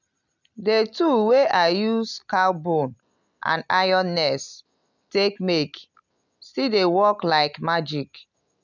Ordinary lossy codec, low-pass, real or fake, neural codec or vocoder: none; 7.2 kHz; real; none